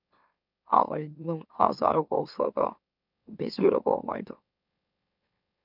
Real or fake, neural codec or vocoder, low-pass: fake; autoencoder, 44.1 kHz, a latent of 192 numbers a frame, MeloTTS; 5.4 kHz